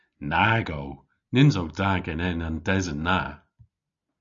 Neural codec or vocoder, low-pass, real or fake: none; 7.2 kHz; real